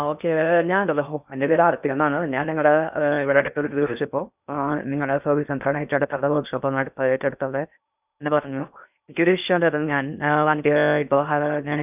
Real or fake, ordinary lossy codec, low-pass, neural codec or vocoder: fake; none; 3.6 kHz; codec, 16 kHz in and 24 kHz out, 0.6 kbps, FocalCodec, streaming, 2048 codes